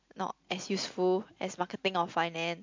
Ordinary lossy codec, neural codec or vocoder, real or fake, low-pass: MP3, 48 kbps; none; real; 7.2 kHz